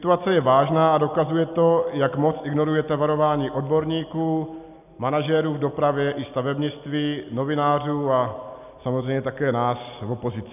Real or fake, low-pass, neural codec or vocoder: real; 3.6 kHz; none